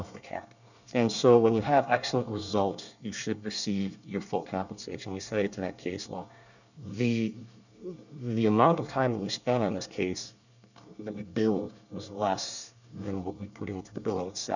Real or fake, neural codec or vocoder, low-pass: fake; codec, 24 kHz, 1 kbps, SNAC; 7.2 kHz